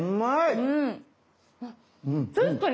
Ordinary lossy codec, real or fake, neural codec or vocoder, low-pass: none; real; none; none